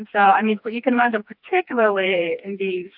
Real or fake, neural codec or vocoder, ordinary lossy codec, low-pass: fake; codec, 16 kHz, 2 kbps, FreqCodec, smaller model; AAC, 48 kbps; 5.4 kHz